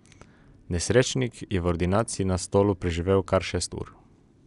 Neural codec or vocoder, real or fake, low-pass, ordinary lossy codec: none; real; 10.8 kHz; none